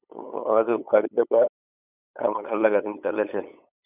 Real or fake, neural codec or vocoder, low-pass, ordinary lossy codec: fake; codec, 16 kHz, 8 kbps, FunCodec, trained on LibriTTS, 25 frames a second; 3.6 kHz; none